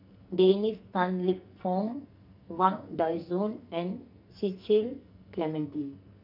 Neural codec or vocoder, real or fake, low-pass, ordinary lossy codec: codec, 44.1 kHz, 2.6 kbps, SNAC; fake; 5.4 kHz; none